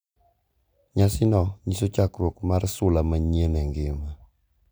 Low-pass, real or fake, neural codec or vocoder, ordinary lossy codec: none; real; none; none